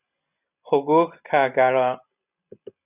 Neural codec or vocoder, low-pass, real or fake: none; 3.6 kHz; real